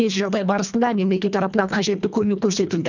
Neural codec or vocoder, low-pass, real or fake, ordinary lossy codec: codec, 24 kHz, 1.5 kbps, HILCodec; 7.2 kHz; fake; none